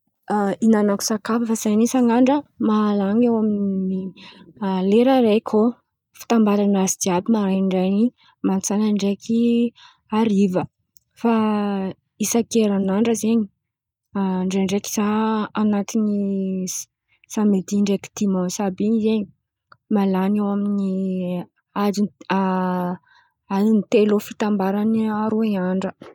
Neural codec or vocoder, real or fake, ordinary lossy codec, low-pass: none; real; none; 19.8 kHz